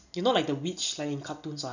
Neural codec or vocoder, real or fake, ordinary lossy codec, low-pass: none; real; none; 7.2 kHz